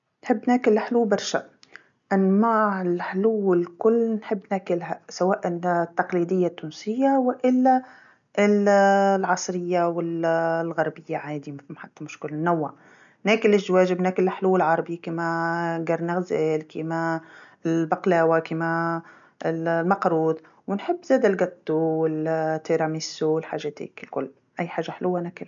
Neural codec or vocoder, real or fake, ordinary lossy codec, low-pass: none; real; none; 7.2 kHz